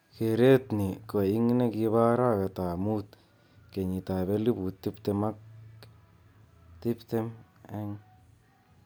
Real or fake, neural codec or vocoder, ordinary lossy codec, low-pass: real; none; none; none